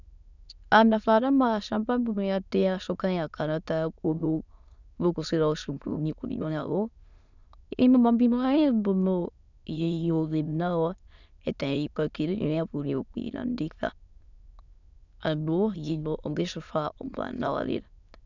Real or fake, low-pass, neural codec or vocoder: fake; 7.2 kHz; autoencoder, 22.05 kHz, a latent of 192 numbers a frame, VITS, trained on many speakers